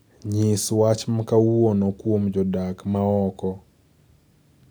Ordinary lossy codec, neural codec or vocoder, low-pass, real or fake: none; none; none; real